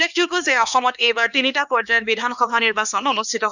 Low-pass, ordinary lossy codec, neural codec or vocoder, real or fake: 7.2 kHz; none; codec, 16 kHz, 2 kbps, X-Codec, HuBERT features, trained on LibriSpeech; fake